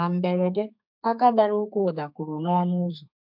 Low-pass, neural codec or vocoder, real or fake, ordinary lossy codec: 5.4 kHz; codec, 32 kHz, 1.9 kbps, SNAC; fake; MP3, 48 kbps